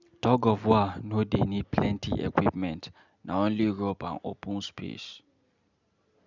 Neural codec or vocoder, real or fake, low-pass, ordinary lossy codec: none; real; 7.2 kHz; none